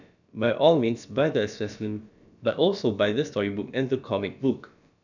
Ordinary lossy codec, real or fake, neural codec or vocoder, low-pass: none; fake; codec, 16 kHz, about 1 kbps, DyCAST, with the encoder's durations; 7.2 kHz